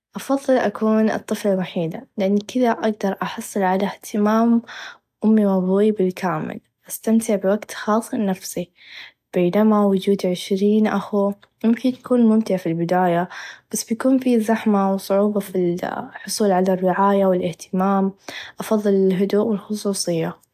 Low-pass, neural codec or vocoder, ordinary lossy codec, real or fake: 14.4 kHz; none; AAC, 96 kbps; real